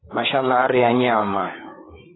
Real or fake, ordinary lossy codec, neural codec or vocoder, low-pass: fake; AAC, 16 kbps; codec, 16 kHz, 4 kbps, FreqCodec, larger model; 7.2 kHz